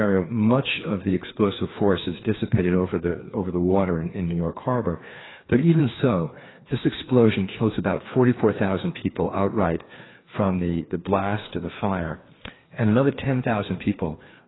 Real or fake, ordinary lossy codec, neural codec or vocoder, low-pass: fake; AAC, 16 kbps; codec, 16 kHz, 2 kbps, FreqCodec, larger model; 7.2 kHz